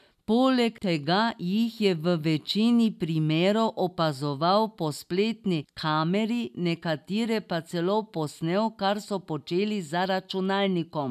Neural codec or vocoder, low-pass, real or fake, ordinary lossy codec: none; 14.4 kHz; real; none